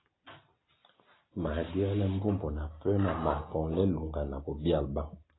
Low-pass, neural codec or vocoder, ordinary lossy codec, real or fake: 7.2 kHz; none; AAC, 16 kbps; real